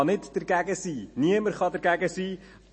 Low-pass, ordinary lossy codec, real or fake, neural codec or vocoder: 9.9 kHz; MP3, 32 kbps; real; none